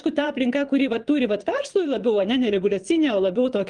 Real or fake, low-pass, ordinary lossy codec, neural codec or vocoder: fake; 9.9 kHz; Opus, 24 kbps; vocoder, 22.05 kHz, 80 mel bands, Vocos